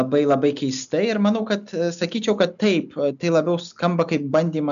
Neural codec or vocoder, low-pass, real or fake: none; 7.2 kHz; real